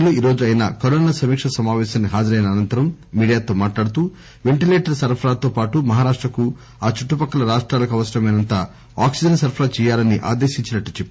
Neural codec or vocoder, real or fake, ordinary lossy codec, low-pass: none; real; none; none